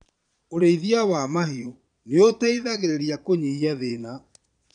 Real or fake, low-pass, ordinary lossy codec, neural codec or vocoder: fake; 9.9 kHz; none; vocoder, 22.05 kHz, 80 mel bands, Vocos